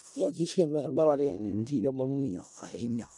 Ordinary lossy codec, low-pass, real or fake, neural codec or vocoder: none; 10.8 kHz; fake; codec, 16 kHz in and 24 kHz out, 0.4 kbps, LongCat-Audio-Codec, four codebook decoder